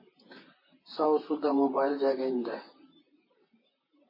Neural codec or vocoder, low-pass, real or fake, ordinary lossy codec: vocoder, 44.1 kHz, 128 mel bands, Pupu-Vocoder; 5.4 kHz; fake; MP3, 24 kbps